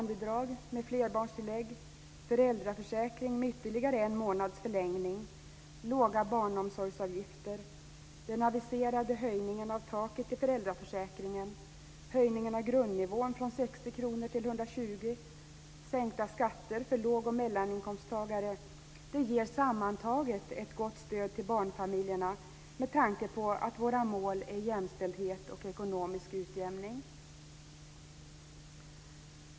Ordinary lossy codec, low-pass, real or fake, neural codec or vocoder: none; none; real; none